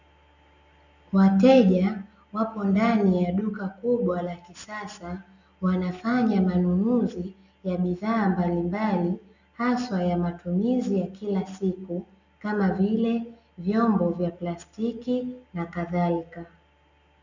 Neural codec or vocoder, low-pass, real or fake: none; 7.2 kHz; real